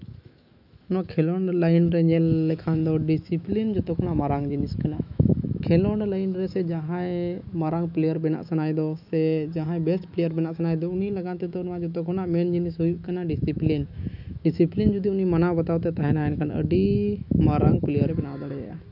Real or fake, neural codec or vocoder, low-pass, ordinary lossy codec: fake; autoencoder, 48 kHz, 128 numbers a frame, DAC-VAE, trained on Japanese speech; 5.4 kHz; none